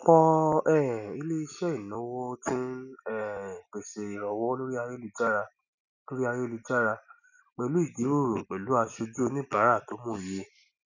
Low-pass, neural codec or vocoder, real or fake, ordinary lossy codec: 7.2 kHz; none; real; none